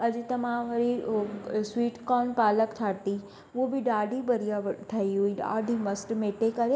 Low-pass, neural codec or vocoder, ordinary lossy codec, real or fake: none; none; none; real